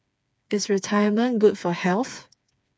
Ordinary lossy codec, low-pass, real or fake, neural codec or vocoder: none; none; fake; codec, 16 kHz, 4 kbps, FreqCodec, smaller model